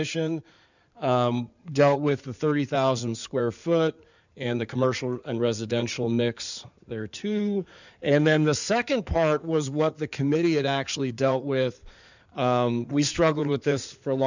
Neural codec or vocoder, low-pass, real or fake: codec, 16 kHz in and 24 kHz out, 2.2 kbps, FireRedTTS-2 codec; 7.2 kHz; fake